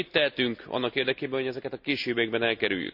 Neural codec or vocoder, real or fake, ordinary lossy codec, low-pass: none; real; none; 5.4 kHz